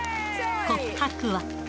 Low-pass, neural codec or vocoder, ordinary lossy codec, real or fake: none; none; none; real